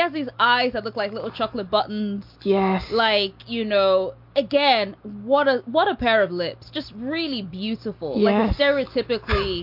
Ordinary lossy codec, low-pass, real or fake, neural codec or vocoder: MP3, 48 kbps; 5.4 kHz; real; none